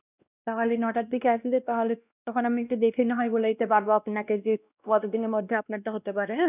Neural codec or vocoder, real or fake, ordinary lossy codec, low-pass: codec, 16 kHz, 1 kbps, X-Codec, WavLM features, trained on Multilingual LibriSpeech; fake; none; 3.6 kHz